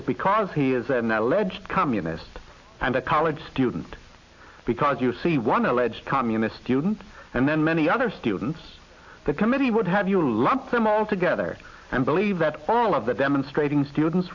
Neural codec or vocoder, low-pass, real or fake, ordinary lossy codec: none; 7.2 kHz; real; AAC, 48 kbps